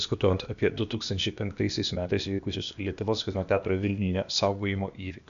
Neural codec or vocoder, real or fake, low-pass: codec, 16 kHz, 0.8 kbps, ZipCodec; fake; 7.2 kHz